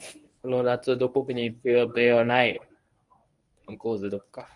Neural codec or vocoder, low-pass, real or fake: codec, 24 kHz, 0.9 kbps, WavTokenizer, medium speech release version 1; 10.8 kHz; fake